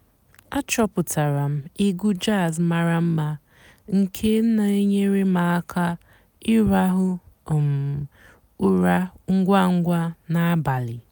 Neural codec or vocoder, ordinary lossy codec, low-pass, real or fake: none; none; none; real